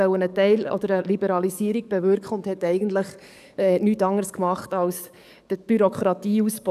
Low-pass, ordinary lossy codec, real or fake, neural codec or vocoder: 14.4 kHz; none; fake; codec, 44.1 kHz, 7.8 kbps, DAC